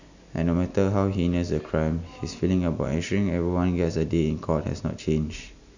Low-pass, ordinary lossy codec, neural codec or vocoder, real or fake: 7.2 kHz; none; none; real